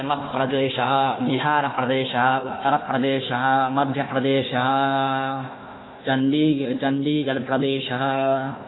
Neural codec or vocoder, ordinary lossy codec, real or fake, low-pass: codec, 16 kHz, 1 kbps, FunCodec, trained on Chinese and English, 50 frames a second; AAC, 16 kbps; fake; 7.2 kHz